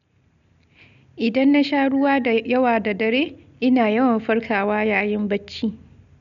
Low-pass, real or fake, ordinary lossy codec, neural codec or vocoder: 7.2 kHz; real; none; none